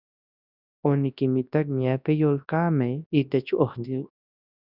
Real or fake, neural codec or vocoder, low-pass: fake; codec, 24 kHz, 0.9 kbps, WavTokenizer, large speech release; 5.4 kHz